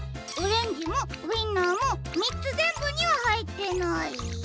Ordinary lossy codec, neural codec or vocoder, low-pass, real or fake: none; none; none; real